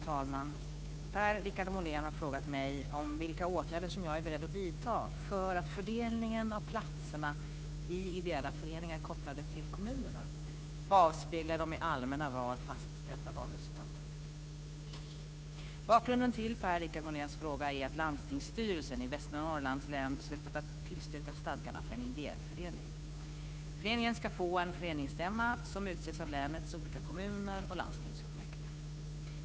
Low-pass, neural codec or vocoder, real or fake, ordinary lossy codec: none; codec, 16 kHz, 2 kbps, FunCodec, trained on Chinese and English, 25 frames a second; fake; none